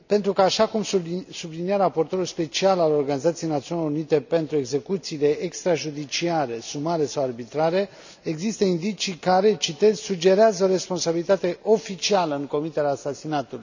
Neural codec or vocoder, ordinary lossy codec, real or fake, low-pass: none; none; real; 7.2 kHz